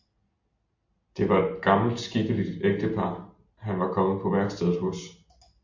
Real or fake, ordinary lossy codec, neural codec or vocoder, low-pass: real; MP3, 48 kbps; none; 7.2 kHz